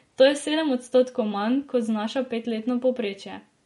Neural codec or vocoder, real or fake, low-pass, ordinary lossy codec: none; real; 19.8 kHz; MP3, 48 kbps